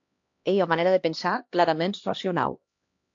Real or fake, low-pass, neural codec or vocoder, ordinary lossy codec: fake; 7.2 kHz; codec, 16 kHz, 1 kbps, X-Codec, HuBERT features, trained on LibriSpeech; AAC, 48 kbps